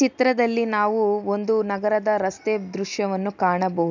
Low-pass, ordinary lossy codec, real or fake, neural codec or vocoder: 7.2 kHz; none; real; none